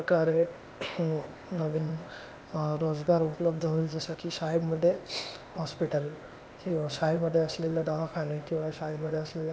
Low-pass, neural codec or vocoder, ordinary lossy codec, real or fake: none; codec, 16 kHz, 0.8 kbps, ZipCodec; none; fake